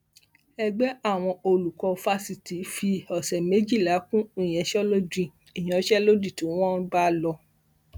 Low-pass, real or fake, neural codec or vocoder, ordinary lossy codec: none; real; none; none